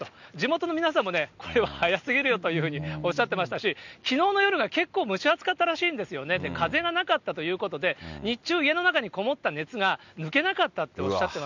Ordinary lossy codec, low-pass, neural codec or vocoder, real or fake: none; 7.2 kHz; none; real